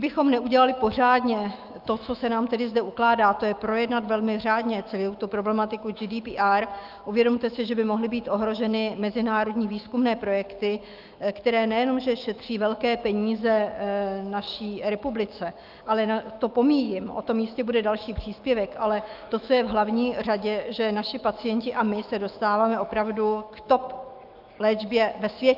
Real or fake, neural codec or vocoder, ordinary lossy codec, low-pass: fake; autoencoder, 48 kHz, 128 numbers a frame, DAC-VAE, trained on Japanese speech; Opus, 24 kbps; 5.4 kHz